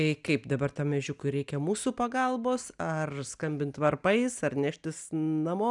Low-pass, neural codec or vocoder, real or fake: 10.8 kHz; none; real